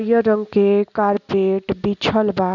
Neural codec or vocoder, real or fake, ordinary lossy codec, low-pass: none; real; none; 7.2 kHz